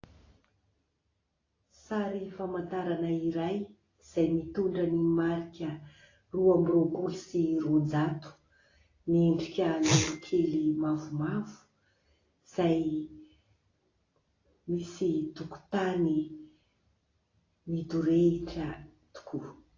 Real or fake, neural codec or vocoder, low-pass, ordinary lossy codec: real; none; 7.2 kHz; AAC, 32 kbps